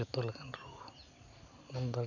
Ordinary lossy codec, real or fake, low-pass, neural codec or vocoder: none; real; 7.2 kHz; none